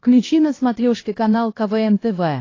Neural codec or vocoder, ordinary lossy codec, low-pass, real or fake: codec, 16 kHz, 1 kbps, X-Codec, HuBERT features, trained on LibriSpeech; AAC, 32 kbps; 7.2 kHz; fake